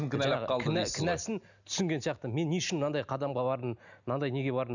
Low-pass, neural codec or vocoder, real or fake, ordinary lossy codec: 7.2 kHz; none; real; none